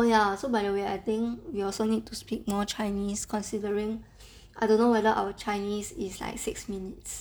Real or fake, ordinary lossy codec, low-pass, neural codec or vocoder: real; none; none; none